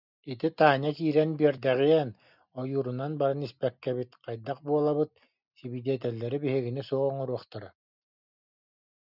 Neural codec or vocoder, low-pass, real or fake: none; 5.4 kHz; real